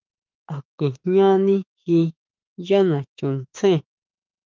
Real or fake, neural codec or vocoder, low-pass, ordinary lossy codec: fake; autoencoder, 48 kHz, 32 numbers a frame, DAC-VAE, trained on Japanese speech; 7.2 kHz; Opus, 24 kbps